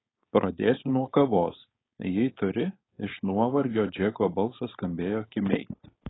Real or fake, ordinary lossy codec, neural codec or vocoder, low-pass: fake; AAC, 16 kbps; codec, 16 kHz, 4.8 kbps, FACodec; 7.2 kHz